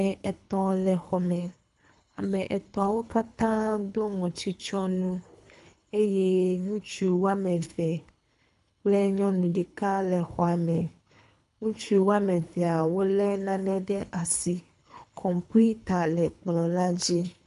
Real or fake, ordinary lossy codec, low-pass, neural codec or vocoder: fake; MP3, 96 kbps; 10.8 kHz; codec, 24 kHz, 3 kbps, HILCodec